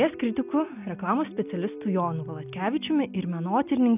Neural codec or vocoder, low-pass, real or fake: none; 3.6 kHz; real